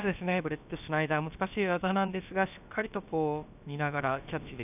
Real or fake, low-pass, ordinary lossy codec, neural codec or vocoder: fake; 3.6 kHz; none; codec, 16 kHz, about 1 kbps, DyCAST, with the encoder's durations